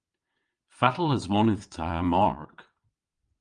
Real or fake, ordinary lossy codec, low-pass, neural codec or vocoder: fake; Opus, 32 kbps; 9.9 kHz; vocoder, 22.05 kHz, 80 mel bands, WaveNeXt